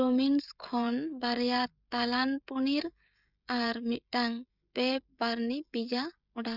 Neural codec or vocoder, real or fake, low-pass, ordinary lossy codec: codec, 16 kHz, 16 kbps, FreqCodec, smaller model; fake; 5.4 kHz; none